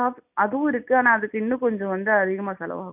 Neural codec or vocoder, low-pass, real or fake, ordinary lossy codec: none; 3.6 kHz; real; none